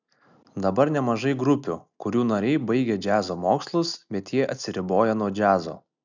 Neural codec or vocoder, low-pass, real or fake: none; 7.2 kHz; real